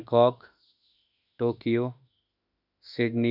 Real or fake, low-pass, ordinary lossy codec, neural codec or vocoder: fake; 5.4 kHz; none; autoencoder, 48 kHz, 32 numbers a frame, DAC-VAE, trained on Japanese speech